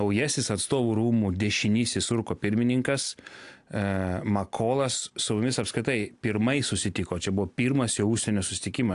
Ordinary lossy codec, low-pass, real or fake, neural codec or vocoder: AAC, 64 kbps; 10.8 kHz; real; none